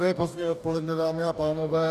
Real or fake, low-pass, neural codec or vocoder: fake; 14.4 kHz; codec, 44.1 kHz, 2.6 kbps, DAC